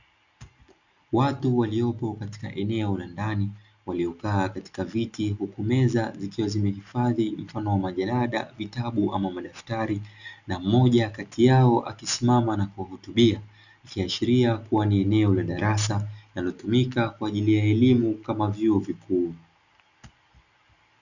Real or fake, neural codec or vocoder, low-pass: real; none; 7.2 kHz